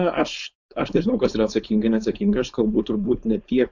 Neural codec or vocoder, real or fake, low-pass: codec, 16 kHz, 4.8 kbps, FACodec; fake; 7.2 kHz